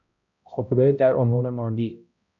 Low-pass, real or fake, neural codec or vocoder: 7.2 kHz; fake; codec, 16 kHz, 0.5 kbps, X-Codec, HuBERT features, trained on balanced general audio